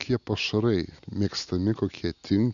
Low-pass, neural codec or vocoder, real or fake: 7.2 kHz; none; real